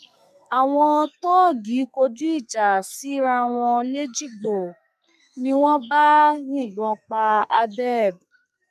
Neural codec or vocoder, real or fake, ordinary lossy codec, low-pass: codec, 32 kHz, 1.9 kbps, SNAC; fake; none; 14.4 kHz